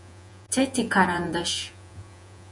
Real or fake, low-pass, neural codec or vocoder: fake; 10.8 kHz; vocoder, 48 kHz, 128 mel bands, Vocos